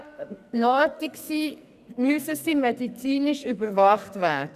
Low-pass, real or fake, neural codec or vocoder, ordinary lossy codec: 14.4 kHz; fake; codec, 44.1 kHz, 2.6 kbps, SNAC; none